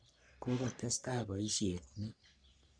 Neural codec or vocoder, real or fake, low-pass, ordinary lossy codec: codec, 44.1 kHz, 3.4 kbps, Pupu-Codec; fake; 9.9 kHz; none